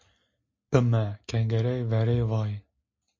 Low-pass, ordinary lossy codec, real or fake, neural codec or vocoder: 7.2 kHz; MP3, 48 kbps; real; none